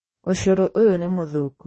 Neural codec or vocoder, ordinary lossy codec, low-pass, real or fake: codec, 44.1 kHz, 2.6 kbps, DAC; MP3, 32 kbps; 10.8 kHz; fake